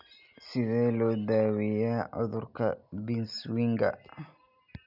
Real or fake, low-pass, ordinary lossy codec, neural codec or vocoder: real; 5.4 kHz; none; none